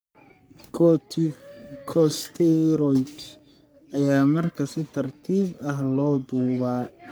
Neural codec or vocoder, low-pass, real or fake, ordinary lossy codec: codec, 44.1 kHz, 3.4 kbps, Pupu-Codec; none; fake; none